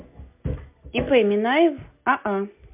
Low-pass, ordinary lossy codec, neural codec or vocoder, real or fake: 3.6 kHz; MP3, 32 kbps; none; real